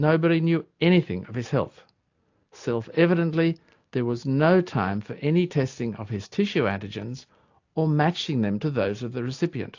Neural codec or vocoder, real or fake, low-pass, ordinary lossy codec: none; real; 7.2 kHz; AAC, 48 kbps